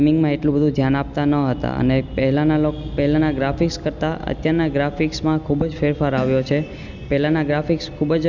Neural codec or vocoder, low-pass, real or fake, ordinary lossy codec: none; 7.2 kHz; real; none